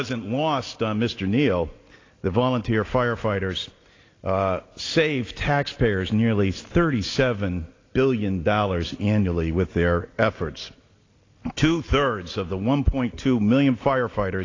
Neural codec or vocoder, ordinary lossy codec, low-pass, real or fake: none; AAC, 32 kbps; 7.2 kHz; real